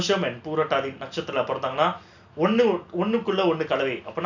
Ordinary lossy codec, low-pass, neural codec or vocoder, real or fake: none; 7.2 kHz; none; real